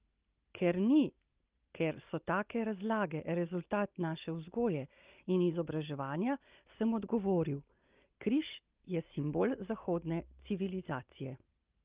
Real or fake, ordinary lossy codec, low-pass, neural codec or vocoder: real; Opus, 32 kbps; 3.6 kHz; none